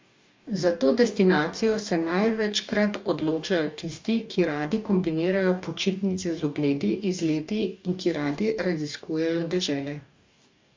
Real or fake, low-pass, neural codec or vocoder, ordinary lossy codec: fake; 7.2 kHz; codec, 44.1 kHz, 2.6 kbps, DAC; MP3, 64 kbps